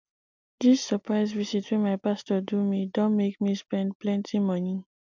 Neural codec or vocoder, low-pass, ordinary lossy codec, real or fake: none; 7.2 kHz; MP3, 64 kbps; real